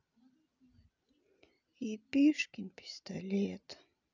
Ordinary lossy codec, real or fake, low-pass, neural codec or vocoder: none; real; 7.2 kHz; none